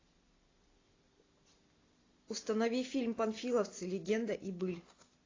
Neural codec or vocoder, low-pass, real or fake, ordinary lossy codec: none; 7.2 kHz; real; AAC, 32 kbps